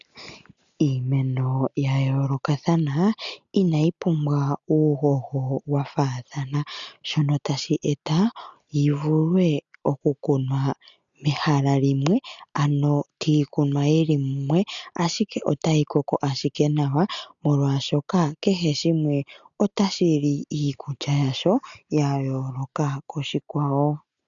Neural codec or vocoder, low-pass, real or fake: none; 7.2 kHz; real